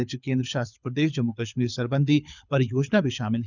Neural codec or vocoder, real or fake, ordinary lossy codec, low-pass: codec, 24 kHz, 6 kbps, HILCodec; fake; none; 7.2 kHz